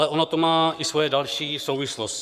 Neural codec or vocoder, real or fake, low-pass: codec, 44.1 kHz, 7.8 kbps, Pupu-Codec; fake; 14.4 kHz